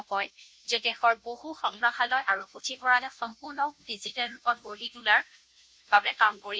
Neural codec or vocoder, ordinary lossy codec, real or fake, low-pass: codec, 16 kHz, 0.5 kbps, FunCodec, trained on Chinese and English, 25 frames a second; none; fake; none